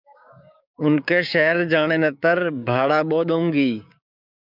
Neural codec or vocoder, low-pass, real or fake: codec, 16 kHz, 6 kbps, DAC; 5.4 kHz; fake